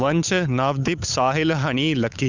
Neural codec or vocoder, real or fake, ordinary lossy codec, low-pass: codec, 16 kHz, 8 kbps, FunCodec, trained on Chinese and English, 25 frames a second; fake; none; 7.2 kHz